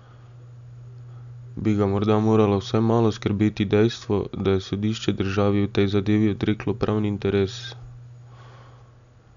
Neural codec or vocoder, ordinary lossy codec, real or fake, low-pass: none; none; real; 7.2 kHz